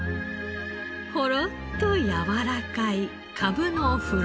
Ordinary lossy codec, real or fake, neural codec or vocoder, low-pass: none; real; none; none